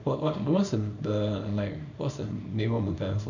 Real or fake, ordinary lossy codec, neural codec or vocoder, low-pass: fake; none; codec, 24 kHz, 0.9 kbps, WavTokenizer, small release; 7.2 kHz